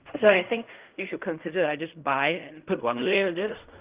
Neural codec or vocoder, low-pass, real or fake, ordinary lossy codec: codec, 16 kHz in and 24 kHz out, 0.4 kbps, LongCat-Audio-Codec, fine tuned four codebook decoder; 3.6 kHz; fake; Opus, 32 kbps